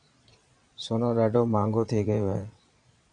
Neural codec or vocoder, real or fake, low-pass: vocoder, 22.05 kHz, 80 mel bands, Vocos; fake; 9.9 kHz